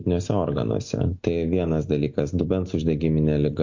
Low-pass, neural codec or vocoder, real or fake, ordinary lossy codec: 7.2 kHz; none; real; MP3, 48 kbps